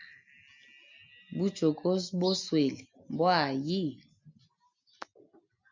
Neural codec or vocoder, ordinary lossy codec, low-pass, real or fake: none; MP3, 64 kbps; 7.2 kHz; real